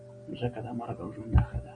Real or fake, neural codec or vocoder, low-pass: real; none; 9.9 kHz